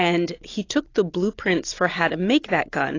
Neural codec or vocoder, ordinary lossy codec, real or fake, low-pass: none; AAC, 32 kbps; real; 7.2 kHz